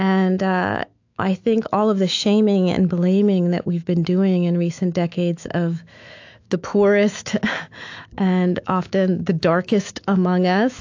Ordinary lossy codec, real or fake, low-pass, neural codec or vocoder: AAC, 48 kbps; fake; 7.2 kHz; autoencoder, 48 kHz, 128 numbers a frame, DAC-VAE, trained on Japanese speech